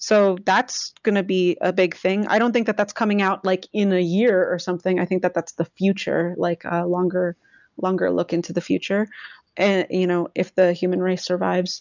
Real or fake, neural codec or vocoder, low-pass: real; none; 7.2 kHz